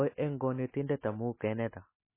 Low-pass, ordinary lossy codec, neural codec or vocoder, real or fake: 3.6 kHz; MP3, 16 kbps; none; real